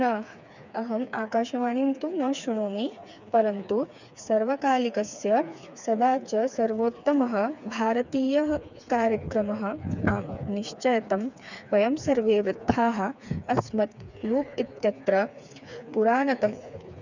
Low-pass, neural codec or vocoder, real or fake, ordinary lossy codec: 7.2 kHz; codec, 16 kHz, 4 kbps, FreqCodec, smaller model; fake; none